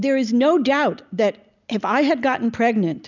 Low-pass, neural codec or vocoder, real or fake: 7.2 kHz; none; real